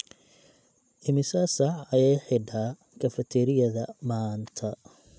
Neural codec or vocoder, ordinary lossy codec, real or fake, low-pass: none; none; real; none